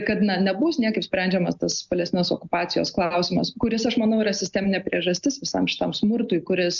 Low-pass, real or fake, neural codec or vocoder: 7.2 kHz; real; none